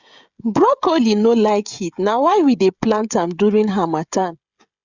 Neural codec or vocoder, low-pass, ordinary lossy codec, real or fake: codec, 16 kHz, 16 kbps, FreqCodec, smaller model; 7.2 kHz; Opus, 64 kbps; fake